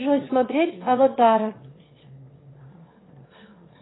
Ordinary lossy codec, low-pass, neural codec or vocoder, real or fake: AAC, 16 kbps; 7.2 kHz; autoencoder, 22.05 kHz, a latent of 192 numbers a frame, VITS, trained on one speaker; fake